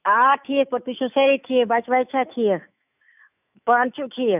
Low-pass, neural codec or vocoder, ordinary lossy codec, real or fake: 3.6 kHz; autoencoder, 48 kHz, 128 numbers a frame, DAC-VAE, trained on Japanese speech; none; fake